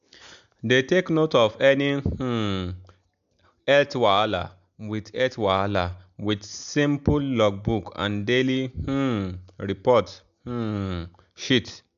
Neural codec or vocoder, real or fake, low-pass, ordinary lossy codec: none; real; 7.2 kHz; AAC, 96 kbps